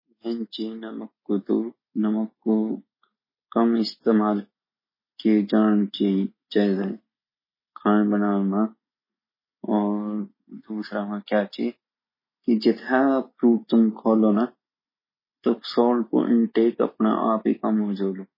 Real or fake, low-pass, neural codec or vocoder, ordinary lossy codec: real; 5.4 kHz; none; MP3, 24 kbps